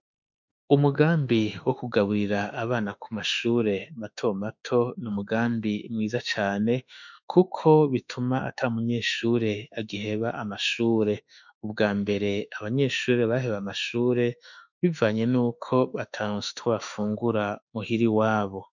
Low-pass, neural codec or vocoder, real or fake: 7.2 kHz; autoencoder, 48 kHz, 32 numbers a frame, DAC-VAE, trained on Japanese speech; fake